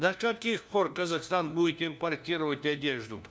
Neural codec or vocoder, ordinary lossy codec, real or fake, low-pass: codec, 16 kHz, 1 kbps, FunCodec, trained on LibriTTS, 50 frames a second; none; fake; none